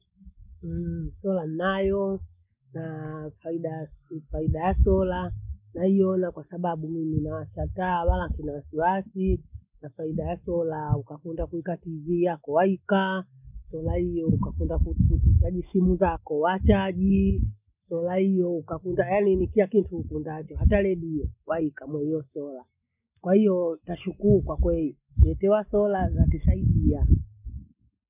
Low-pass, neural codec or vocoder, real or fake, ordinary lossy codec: 3.6 kHz; none; real; none